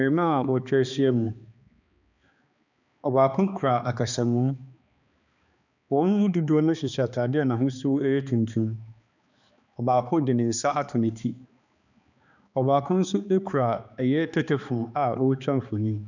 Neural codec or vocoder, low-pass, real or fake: codec, 16 kHz, 2 kbps, X-Codec, HuBERT features, trained on balanced general audio; 7.2 kHz; fake